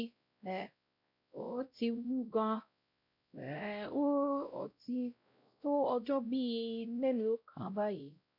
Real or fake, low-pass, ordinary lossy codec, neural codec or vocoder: fake; 5.4 kHz; none; codec, 16 kHz, 0.5 kbps, X-Codec, WavLM features, trained on Multilingual LibriSpeech